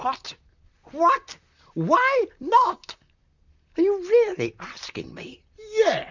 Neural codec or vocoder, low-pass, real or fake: vocoder, 44.1 kHz, 128 mel bands, Pupu-Vocoder; 7.2 kHz; fake